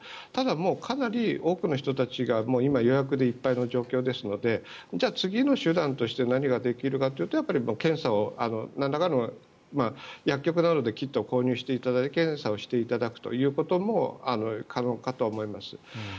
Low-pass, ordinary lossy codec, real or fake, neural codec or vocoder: none; none; real; none